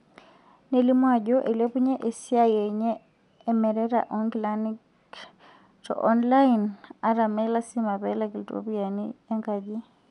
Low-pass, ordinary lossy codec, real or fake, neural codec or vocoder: 10.8 kHz; none; real; none